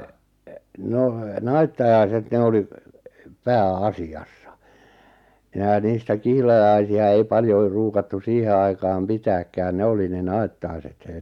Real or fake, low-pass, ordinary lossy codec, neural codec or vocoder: real; 19.8 kHz; MP3, 96 kbps; none